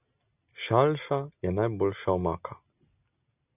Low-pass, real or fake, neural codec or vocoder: 3.6 kHz; real; none